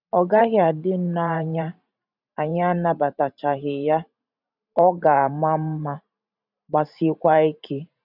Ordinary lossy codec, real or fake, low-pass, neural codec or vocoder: none; fake; 5.4 kHz; vocoder, 44.1 kHz, 128 mel bands every 512 samples, BigVGAN v2